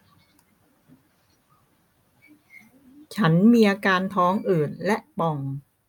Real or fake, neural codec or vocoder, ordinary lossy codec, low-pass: real; none; none; 19.8 kHz